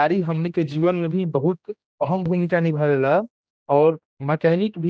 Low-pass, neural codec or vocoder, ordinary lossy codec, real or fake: none; codec, 16 kHz, 1 kbps, X-Codec, HuBERT features, trained on general audio; none; fake